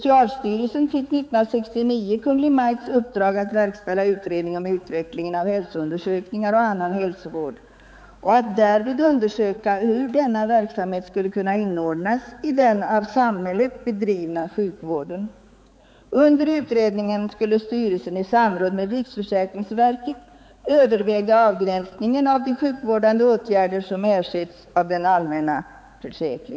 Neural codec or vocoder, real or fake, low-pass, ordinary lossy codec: codec, 16 kHz, 4 kbps, X-Codec, HuBERT features, trained on balanced general audio; fake; none; none